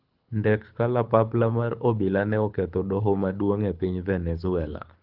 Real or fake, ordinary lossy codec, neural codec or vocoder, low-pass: fake; Opus, 32 kbps; codec, 24 kHz, 6 kbps, HILCodec; 5.4 kHz